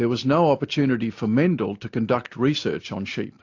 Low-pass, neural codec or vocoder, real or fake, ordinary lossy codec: 7.2 kHz; none; real; AAC, 48 kbps